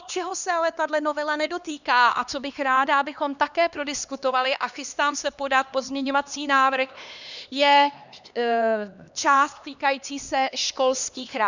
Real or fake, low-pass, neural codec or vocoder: fake; 7.2 kHz; codec, 16 kHz, 2 kbps, X-Codec, HuBERT features, trained on LibriSpeech